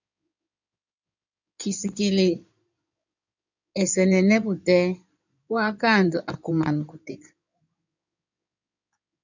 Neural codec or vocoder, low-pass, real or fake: codec, 16 kHz in and 24 kHz out, 2.2 kbps, FireRedTTS-2 codec; 7.2 kHz; fake